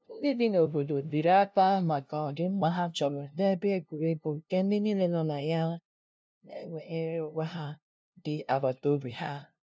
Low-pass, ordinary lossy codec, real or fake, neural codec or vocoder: none; none; fake; codec, 16 kHz, 0.5 kbps, FunCodec, trained on LibriTTS, 25 frames a second